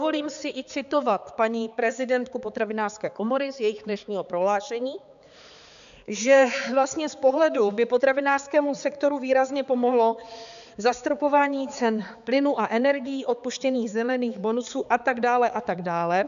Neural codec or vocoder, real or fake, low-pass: codec, 16 kHz, 4 kbps, X-Codec, HuBERT features, trained on balanced general audio; fake; 7.2 kHz